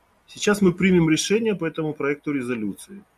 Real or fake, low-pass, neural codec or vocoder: real; 14.4 kHz; none